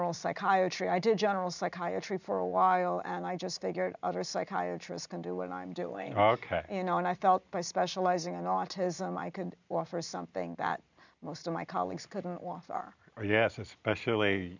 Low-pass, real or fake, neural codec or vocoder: 7.2 kHz; real; none